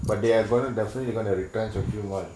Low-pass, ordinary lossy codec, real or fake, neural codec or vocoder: none; none; real; none